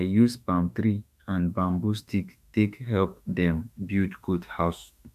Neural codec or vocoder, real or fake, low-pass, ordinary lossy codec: autoencoder, 48 kHz, 32 numbers a frame, DAC-VAE, trained on Japanese speech; fake; 14.4 kHz; none